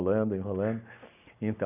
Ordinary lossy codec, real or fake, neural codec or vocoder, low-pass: none; real; none; 3.6 kHz